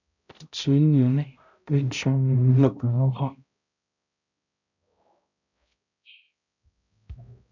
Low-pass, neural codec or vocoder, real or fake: 7.2 kHz; codec, 16 kHz, 0.5 kbps, X-Codec, HuBERT features, trained on balanced general audio; fake